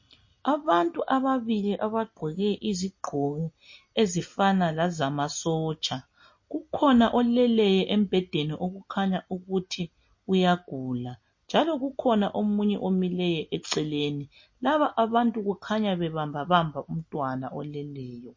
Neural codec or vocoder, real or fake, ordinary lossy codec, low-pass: none; real; MP3, 32 kbps; 7.2 kHz